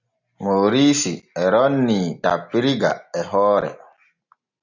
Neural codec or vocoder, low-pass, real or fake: none; 7.2 kHz; real